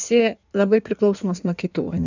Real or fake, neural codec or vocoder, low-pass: fake; codec, 16 kHz in and 24 kHz out, 1.1 kbps, FireRedTTS-2 codec; 7.2 kHz